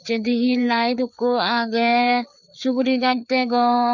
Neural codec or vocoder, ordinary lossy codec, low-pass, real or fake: codec, 16 kHz, 4 kbps, FreqCodec, larger model; none; 7.2 kHz; fake